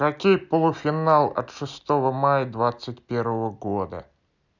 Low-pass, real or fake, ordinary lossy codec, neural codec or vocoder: 7.2 kHz; real; none; none